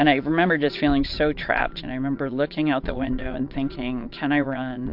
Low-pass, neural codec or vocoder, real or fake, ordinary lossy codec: 5.4 kHz; vocoder, 22.05 kHz, 80 mel bands, Vocos; fake; MP3, 48 kbps